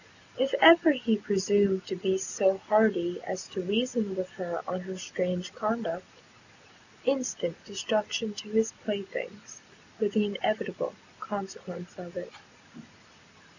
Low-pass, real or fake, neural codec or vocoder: 7.2 kHz; real; none